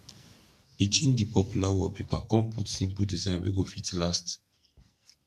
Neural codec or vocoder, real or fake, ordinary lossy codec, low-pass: codec, 44.1 kHz, 2.6 kbps, SNAC; fake; none; 14.4 kHz